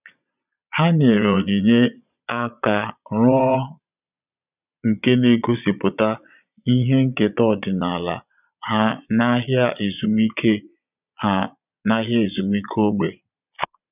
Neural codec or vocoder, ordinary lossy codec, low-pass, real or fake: vocoder, 22.05 kHz, 80 mel bands, Vocos; none; 3.6 kHz; fake